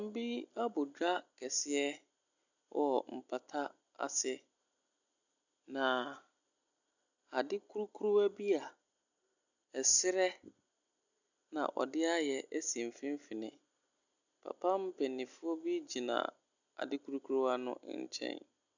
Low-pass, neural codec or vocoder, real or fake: 7.2 kHz; none; real